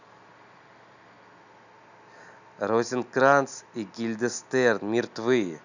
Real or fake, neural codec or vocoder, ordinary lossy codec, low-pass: real; none; none; 7.2 kHz